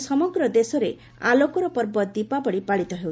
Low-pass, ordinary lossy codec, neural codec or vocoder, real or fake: none; none; none; real